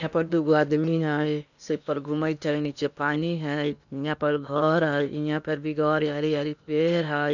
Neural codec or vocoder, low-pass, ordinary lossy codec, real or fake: codec, 16 kHz in and 24 kHz out, 0.8 kbps, FocalCodec, streaming, 65536 codes; 7.2 kHz; none; fake